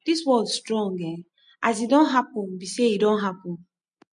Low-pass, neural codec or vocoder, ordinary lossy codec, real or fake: 9.9 kHz; none; MP3, 48 kbps; real